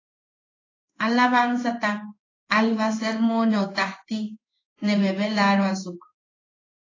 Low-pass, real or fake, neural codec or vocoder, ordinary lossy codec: 7.2 kHz; fake; codec, 16 kHz in and 24 kHz out, 1 kbps, XY-Tokenizer; AAC, 32 kbps